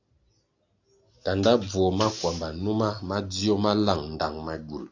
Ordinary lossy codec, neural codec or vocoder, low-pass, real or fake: AAC, 48 kbps; none; 7.2 kHz; real